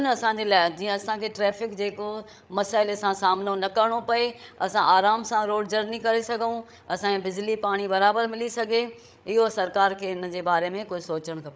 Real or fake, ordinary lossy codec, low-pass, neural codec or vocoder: fake; none; none; codec, 16 kHz, 8 kbps, FreqCodec, larger model